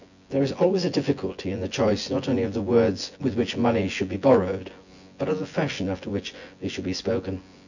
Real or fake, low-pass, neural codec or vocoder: fake; 7.2 kHz; vocoder, 24 kHz, 100 mel bands, Vocos